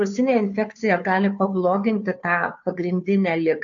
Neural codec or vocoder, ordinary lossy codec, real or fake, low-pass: codec, 16 kHz, 2 kbps, FunCodec, trained on Chinese and English, 25 frames a second; AAC, 64 kbps; fake; 7.2 kHz